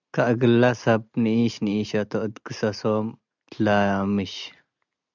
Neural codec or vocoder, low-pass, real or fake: none; 7.2 kHz; real